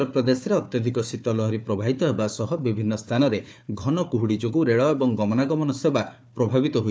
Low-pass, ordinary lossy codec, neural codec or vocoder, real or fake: none; none; codec, 16 kHz, 4 kbps, FunCodec, trained on Chinese and English, 50 frames a second; fake